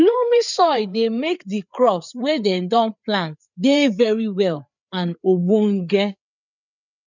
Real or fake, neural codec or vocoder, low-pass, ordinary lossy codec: fake; codec, 16 kHz, 4 kbps, FreqCodec, larger model; 7.2 kHz; none